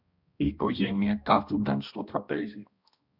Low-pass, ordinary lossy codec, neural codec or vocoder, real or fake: 5.4 kHz; Opus, 64 kbps; codec, 16 kHz, 1 kbps, X-Codec, HuBERT features, trained on balanced general audio; fake